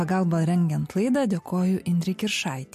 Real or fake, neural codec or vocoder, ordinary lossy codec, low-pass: real; none; MP3, 64 kbps; 14.4 kHz